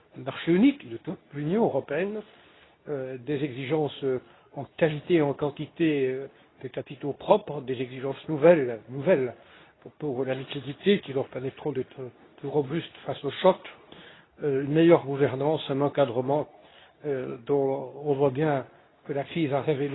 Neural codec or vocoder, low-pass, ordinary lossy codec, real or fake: codec, 24 kHz, 0.9 kbps, WavTokenizer, medium speech release version 2; 7.2 kHz; AAC, 16 kbps; fake